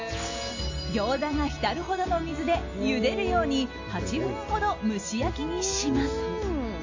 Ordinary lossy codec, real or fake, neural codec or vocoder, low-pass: AAC, 48 kbps; real; none; 7.2 kHz